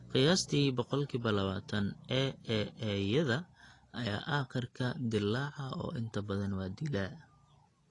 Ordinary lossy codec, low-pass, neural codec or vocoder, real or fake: AAC, 32 kbps; 10.8 kHz; none; real